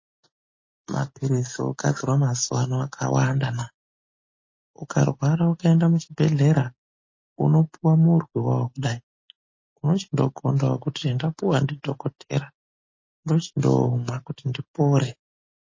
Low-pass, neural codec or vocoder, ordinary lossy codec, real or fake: 7.2 kHz; none; MP3, 32 kbps; real